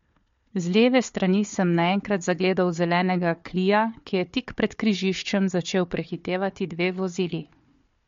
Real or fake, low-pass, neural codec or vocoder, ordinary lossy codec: fake; 7.2 kHz; codec, 16 kHz, 4 kbps, FunCodec, trained on Chinese and English, 50 frames a second; MP3, 48 kbps